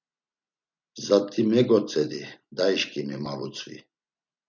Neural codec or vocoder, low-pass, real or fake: none; 7.2 kHz; real